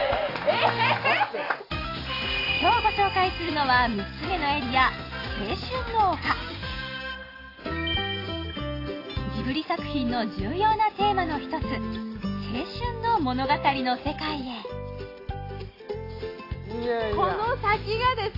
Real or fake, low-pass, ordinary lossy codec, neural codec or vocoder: real; 5.4 kHz; AAC, 24 kbps; none